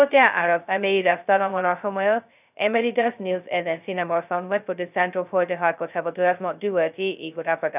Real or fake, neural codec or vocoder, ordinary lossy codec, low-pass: fake; codec, 16 kHz, 0.2 kbps, FocalCodec; none; 3.6 kHz